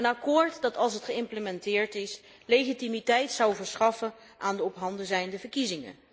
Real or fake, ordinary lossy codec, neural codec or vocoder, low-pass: real; none; none; none